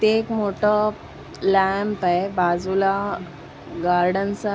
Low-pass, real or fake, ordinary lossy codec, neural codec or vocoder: none; real; none; none